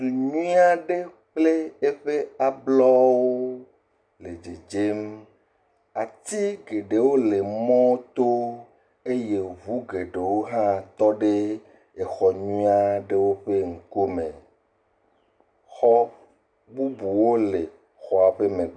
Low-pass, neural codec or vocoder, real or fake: 9.9 kHz; none; real